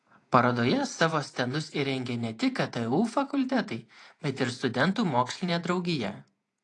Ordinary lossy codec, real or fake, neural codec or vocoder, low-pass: AAC, 48 kbps; fake; vocoder, 48 kHz, 128 mel bands, Vocos; 10.8 kHz